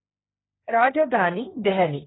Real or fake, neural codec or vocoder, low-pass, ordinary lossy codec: fake; codec, 16 kHz, 1.1 kbps, Voila-Tokenizer; 7.2 kHz; AAC, 16 kbps